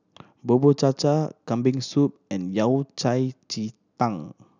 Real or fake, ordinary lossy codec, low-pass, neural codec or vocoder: real; none; 7.2 kHz; none